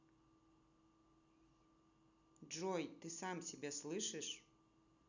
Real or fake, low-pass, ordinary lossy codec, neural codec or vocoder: real; 7.2 kHz; none; none